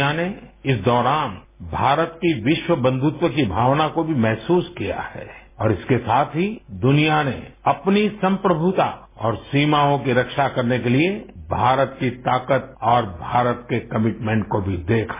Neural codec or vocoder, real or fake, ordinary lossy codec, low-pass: none; real; MP3, 16 kbps; 3.6 kHz